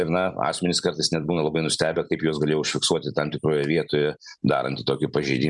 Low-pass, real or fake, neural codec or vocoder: 10.8 kHz; real; none